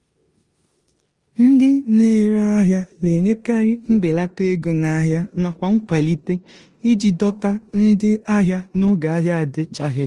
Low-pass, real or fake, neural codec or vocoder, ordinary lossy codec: 10.8 kHz; fake; codec, 16 kHz in and 24 kHz out, 0.9 kbps, LongCat-Audio-Codec, four codebook decoder; Opus, 24 kbps